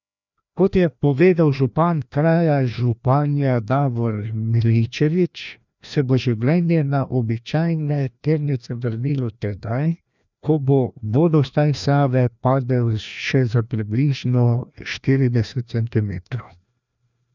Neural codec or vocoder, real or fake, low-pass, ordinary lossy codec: codec, 16 kHz, 1 kbps, FreqCodec, larger model; fake; 7.2 kHz; none